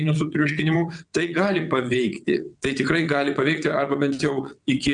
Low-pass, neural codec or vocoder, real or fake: 9.9 kHz; vocoder, 22.05 kHz, 80 mel bands, WaveNeXt; fake